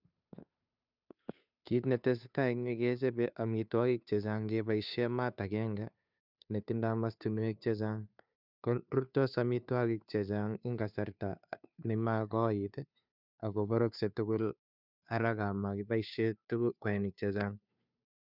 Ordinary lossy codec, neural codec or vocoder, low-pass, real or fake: none; codec, 16 kHz, 2 kbps, FunCodec, trained on Chinese and English, 25 frames a second; 5.4 kHz; fake